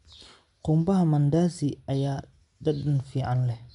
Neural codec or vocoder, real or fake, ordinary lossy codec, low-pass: none; real; none; 10.8 kHz